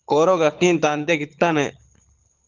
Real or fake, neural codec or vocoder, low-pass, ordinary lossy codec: fake; codec, 24 kHz, 6 kbps, HILCodec; 7.2 kHz; Opus, 24 kbps